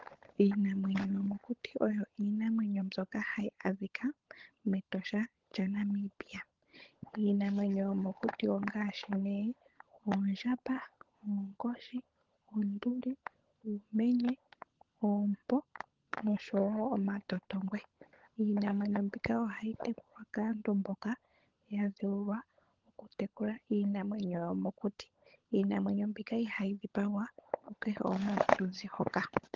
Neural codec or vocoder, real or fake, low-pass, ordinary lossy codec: codec, 16 kHz, 16 kbps, FunCodec, trained on LibriTTS, 50 frames a second; fake; 7.2 kHz; Opus, 16 kbps